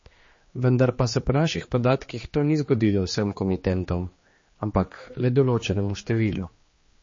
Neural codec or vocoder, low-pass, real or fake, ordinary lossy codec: codec, 16 kHz, 2 kbps, X-Codec, HuBERT features, trained on general audio; 7.2 kHz; fake; MP3, 32 kbps